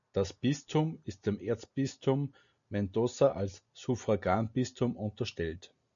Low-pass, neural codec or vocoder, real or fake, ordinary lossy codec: 7.2 kHz; none; real; MP3, 96 kbps